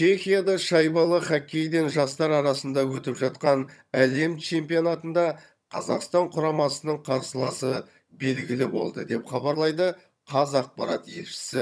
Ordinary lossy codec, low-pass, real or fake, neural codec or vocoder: none; none; fake; vocoder, 22.05 kHz, 80 mel bands, HiFi-GAN